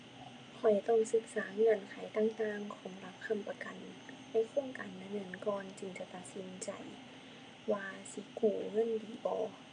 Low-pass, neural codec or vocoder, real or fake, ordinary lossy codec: 9.9 kHz; none; real; none